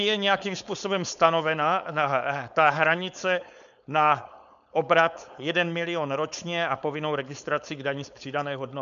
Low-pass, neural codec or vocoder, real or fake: 7.2 kHz; codec, 16 kHz, 4.8 kbps, FACodec; fake